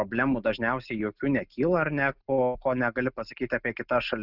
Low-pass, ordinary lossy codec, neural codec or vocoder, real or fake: 5.4 kHz; Opus, 64 kbps; none; real